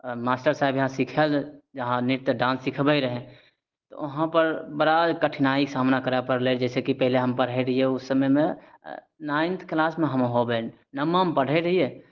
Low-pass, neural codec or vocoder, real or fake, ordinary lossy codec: 7.2 kHz; none; real; Opus, 16 kbps